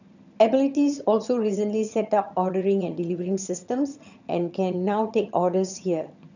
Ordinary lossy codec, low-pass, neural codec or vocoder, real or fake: none; 7.2 kHz; vocoder, 22.05 kHz, 80 mel bands, HiFi-GAN; fake